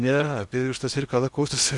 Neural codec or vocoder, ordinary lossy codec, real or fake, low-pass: codec, 16 kHz in and 24 kHz out, 0.8 kbps, FocalCodec, streaming, 65536 codes; Opus, 64 kbps; fake; 10.8 kHz